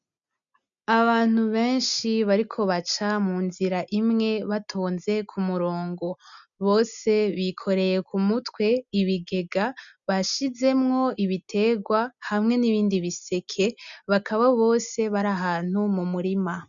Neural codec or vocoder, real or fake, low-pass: none; real; 7.2 kHz